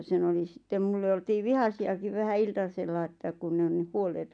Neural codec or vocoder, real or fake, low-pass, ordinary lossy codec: none; real; 9.9 kHz; none